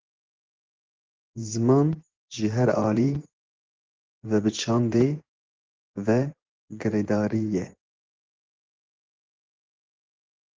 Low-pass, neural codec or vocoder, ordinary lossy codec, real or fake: 7.2 kHz; vocoder, 24 kHz, 100 mel bands, Vocos; Opus, 16 kbps; fake